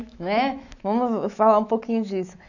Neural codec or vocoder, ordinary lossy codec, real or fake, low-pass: autoencoder, 48 kHz, 128 numbers a frame, DAC-VAE, trained on Japanese speech; none; fake; 7.2 kHz